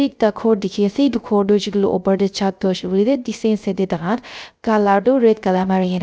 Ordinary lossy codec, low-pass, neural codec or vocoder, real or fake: none; none; codec, 16 kHz, 0.3 kbps, FocalCodec; fake